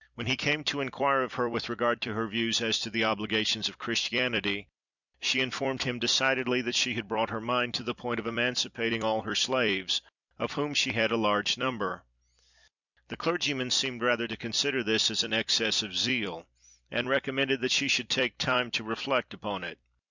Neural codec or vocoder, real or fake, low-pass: vocoder, 44.1 kHz, 128 mel bands every 256 samples, BigVGAN v2; fake; 7.2 kHz